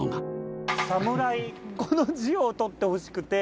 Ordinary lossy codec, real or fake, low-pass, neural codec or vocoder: none; real; none; none